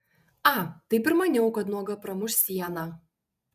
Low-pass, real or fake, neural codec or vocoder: 14.4 kHz; fake; vocoder, 48 kHz, 128 mel bands, Vocos